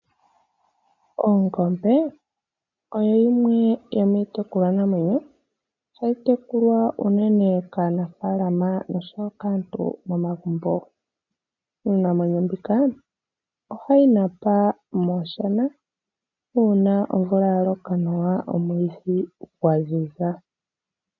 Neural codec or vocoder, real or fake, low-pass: none; real; 7.2 kHz